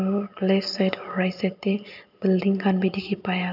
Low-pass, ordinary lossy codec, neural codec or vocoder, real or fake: 5.4 kHz; none; none; real